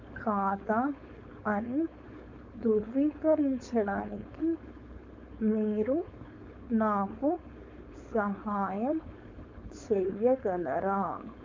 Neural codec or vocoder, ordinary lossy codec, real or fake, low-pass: codec, 16 kHz, 4.8 kbps, FACodec; MP3, 64 kbps; fake; 7.2 kHz